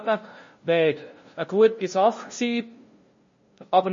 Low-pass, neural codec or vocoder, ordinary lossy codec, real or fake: 7.2 kHz; codec, 16 kHz, 0.5 kbps, FunCodec, trained on LibriTTS, 25 frames a second; MP3, 32 kbps; fake